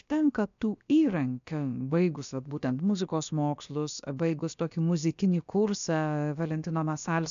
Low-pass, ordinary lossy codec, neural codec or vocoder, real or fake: 7.2 kHz; AAC, 96 kbps; codec, 16 kHz, about 1 kbps, DyCAST, with the encoder's durations; fake